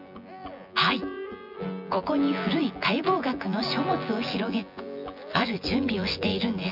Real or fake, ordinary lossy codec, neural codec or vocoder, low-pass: fake; none; vocoder, 24 kHz, 100 mel bands, Vocos; 5.4 kHz